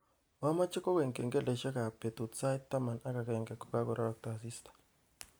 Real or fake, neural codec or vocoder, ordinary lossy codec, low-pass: real; none; none; none